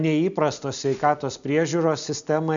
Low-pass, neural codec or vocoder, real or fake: 7.2 kHz; none; real